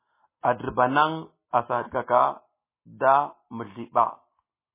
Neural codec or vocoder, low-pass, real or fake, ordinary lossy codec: none; 3.6 kHz; real; MP3, 16 kbps